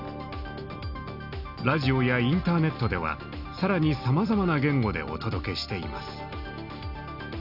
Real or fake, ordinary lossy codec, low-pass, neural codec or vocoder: real; none; 5.4 kHz; none